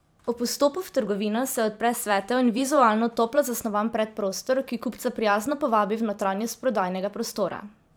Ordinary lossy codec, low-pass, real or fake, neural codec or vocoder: none; none; fake; vocoder, 44.1 kHz, 128 mel bands every 512 samples, BigVGAN v2